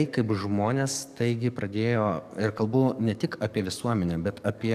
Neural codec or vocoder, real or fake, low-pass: codec, 44.1 kHz, 7.8 kbps, DAC; fake; 14.4 kHz